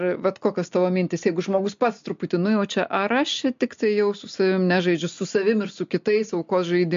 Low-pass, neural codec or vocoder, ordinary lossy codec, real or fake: 7.2 kHz; none; MP3, 48 kbps; real